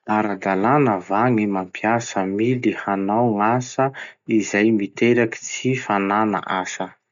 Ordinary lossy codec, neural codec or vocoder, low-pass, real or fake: none; none; 7.2 kHz; real